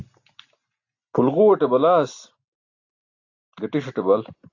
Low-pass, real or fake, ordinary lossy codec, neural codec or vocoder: 7.2 kHz; real; AAC, 48 kbps; none